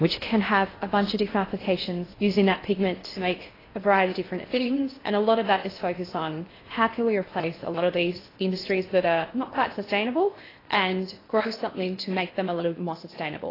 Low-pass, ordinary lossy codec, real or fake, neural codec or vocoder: 5.4 kHz; AAC, 24 kbps; fake; codec, 16 kHz in and 24 kHz out, 0.6 kbps, FocalCodec, streaming, 4096 codes